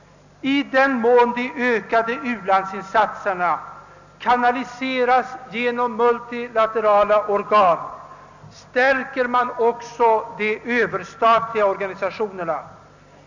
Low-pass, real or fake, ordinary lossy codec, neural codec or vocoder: 7.2 kHz; real; none; none